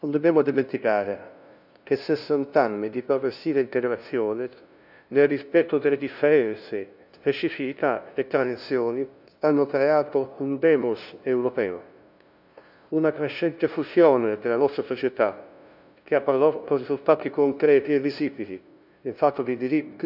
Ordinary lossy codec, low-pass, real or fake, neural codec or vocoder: none; 5.4 kHz; fake; codec, 16 kHz, 0.5 kbps, FunCodec, trained on LibriTTS, 25 frames a second